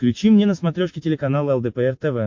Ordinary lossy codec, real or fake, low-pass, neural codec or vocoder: MP3, 48 kbps; real; 7.2 kHz; none